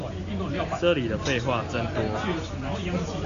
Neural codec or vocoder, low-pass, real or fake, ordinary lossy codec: none; 7.2 kHz; real; AAC, 64 kbps